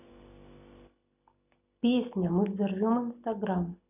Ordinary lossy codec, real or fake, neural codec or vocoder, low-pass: none; real; none; 3.6 kHz